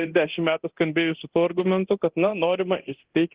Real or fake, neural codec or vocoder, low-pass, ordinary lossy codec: fake; codec, 24 kHz, 0.9 kbps, DualCodec; 3.6 kHz; Opus, 16 kbps